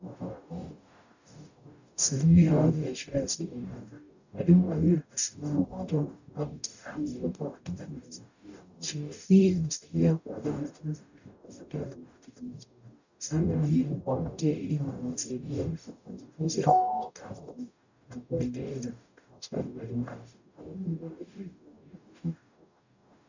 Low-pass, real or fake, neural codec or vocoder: 7.2 kHz; fake; codec, 44.1 kHz, 0.9 kbps, DAC